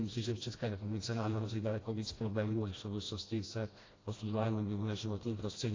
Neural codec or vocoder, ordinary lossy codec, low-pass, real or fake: codec, 16 kHz, 1 kbps, FreqCodec, smaller model; AAC, 32 kbps; 7.2 kHz; fake